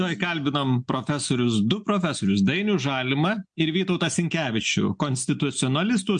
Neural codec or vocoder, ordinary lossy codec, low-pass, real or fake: none; MP3, 64 kbps; 9.9 kHz; real